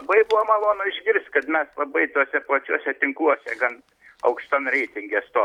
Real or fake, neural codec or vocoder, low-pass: real; none; 19.8 kHz